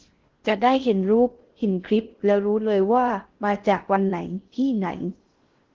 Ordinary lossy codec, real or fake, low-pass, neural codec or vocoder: Opus, 16 kbps; fake; 7.2 kHz; codec, 16 kHz in and 24 kHz out, 0.6 kbps, FocalCodec, streaming, 2048 codes